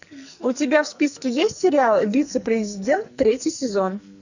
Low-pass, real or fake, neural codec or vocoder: 7.2 kHz; fake; codec, 44.1 kHz, 2.6 kbps, SNAC